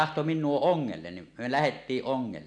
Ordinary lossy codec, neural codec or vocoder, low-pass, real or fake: none; none; 9.9 kHz; real